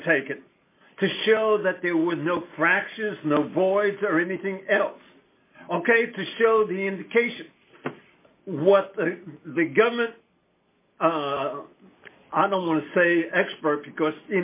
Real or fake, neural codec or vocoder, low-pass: real; none; 3.6 kHz